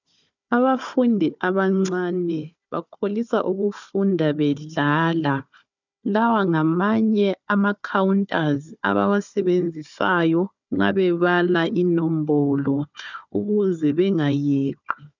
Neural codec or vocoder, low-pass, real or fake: codec, 16 kHz, 4 kbps, FunCodec, trained on Chinese and English, 50 frames a second; 7.2 kHz; fake